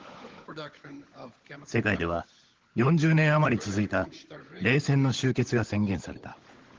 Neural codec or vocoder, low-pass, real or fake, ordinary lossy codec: codec, 16 kHz, 16 kbps, FunCodec, trained on LibriTTS, 50 frames a second; 7.2 kHz; fake; Opus, 16 kbps